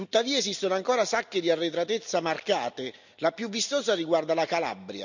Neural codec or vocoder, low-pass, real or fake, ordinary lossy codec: none; 7.2 kHz; real; none